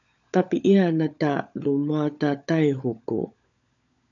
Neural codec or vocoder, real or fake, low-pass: codec, 16 kHz, 16 kbps, FunCodec, trained on LibriTTS, 50 frames a second; fake; 7.2 kHz